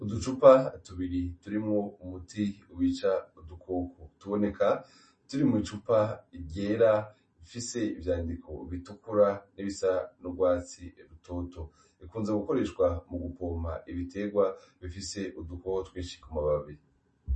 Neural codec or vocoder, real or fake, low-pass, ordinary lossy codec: none; real; 10.8 kHz; MP3, 32 kbps